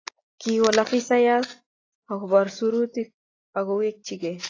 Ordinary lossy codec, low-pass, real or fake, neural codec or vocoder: AAC, 32 kbps; 7.2 kHz; real; none